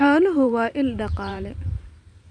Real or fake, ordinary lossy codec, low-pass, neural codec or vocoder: fake; none; 9.9 kHz; vocoder, 44.1 kHz, 128 mel bands every 512 samples, BigVGAN v2